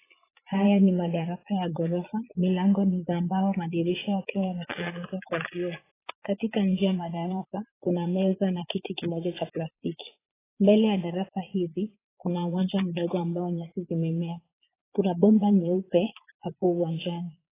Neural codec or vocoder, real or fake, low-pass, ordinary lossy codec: vocoder, 22.05 kHz, 80 mel bands, Vocos; fake; 3.6 kHz; AAC, 16 kbps